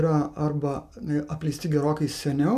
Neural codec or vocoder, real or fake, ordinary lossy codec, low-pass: none; real; MP3, 96 kbps; 14.4 kHz